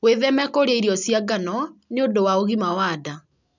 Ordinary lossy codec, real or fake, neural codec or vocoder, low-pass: none; real; none; 7.2 kHz